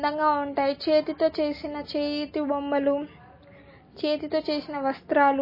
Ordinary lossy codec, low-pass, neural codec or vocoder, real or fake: MP3, 24 kbps; 5.4 kHz; none; real